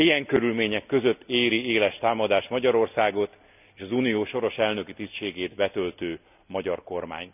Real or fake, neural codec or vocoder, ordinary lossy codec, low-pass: real; none; none; 3.6 kHz